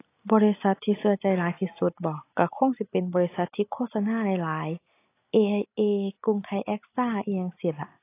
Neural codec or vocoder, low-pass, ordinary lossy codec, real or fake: none; 3.6 kHz; AAC, 24 kbps; real